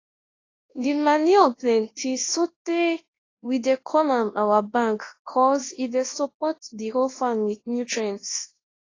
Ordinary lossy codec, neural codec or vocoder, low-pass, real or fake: AAC, 32 kbps; codec, 24 kHz, 0.9 kbps, WavTokenizer, large speech release; 7.2 kHz; fake